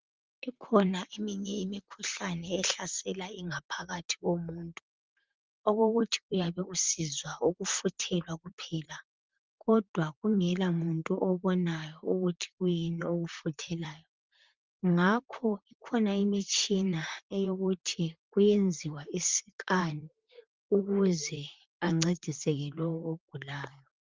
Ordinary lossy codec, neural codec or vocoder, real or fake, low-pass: Opus, 32 kbps; vocoder, 44.1 kHz, 128 mel bands, Pupu-Vocoder; fake; 7.2 kHz